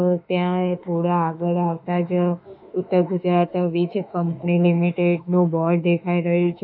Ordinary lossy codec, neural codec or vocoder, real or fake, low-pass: none; autoencoder, 48 kHz, 32 numbers a frame, DAC-VAE, trained on Japanese speech; fake; 5.4 kHz